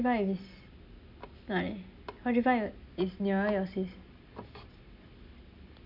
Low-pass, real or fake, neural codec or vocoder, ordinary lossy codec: 5.4 kHz; real; none; none